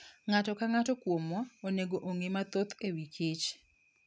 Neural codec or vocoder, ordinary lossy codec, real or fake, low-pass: none; none; real; none